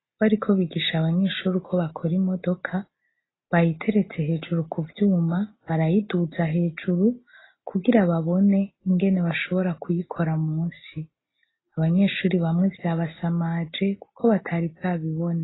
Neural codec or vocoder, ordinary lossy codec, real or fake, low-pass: none; AAC, 16 kbps; real; 7.2 kHz